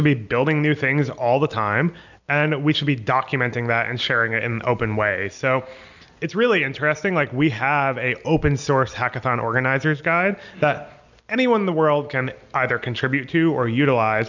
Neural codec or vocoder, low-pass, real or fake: none; 7.2 kHz; real